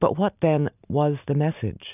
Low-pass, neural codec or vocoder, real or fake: 3.6 kHz; vocoder, 44.1 kHz, 128 mel bands every 512 samples, BigVGAN v2; fake